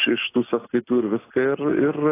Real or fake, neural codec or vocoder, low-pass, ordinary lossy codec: real; none; 3.6 kHz; AAC, 16 kbps